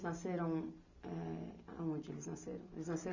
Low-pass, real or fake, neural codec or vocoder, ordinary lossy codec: 7.2 kHz; real; none; none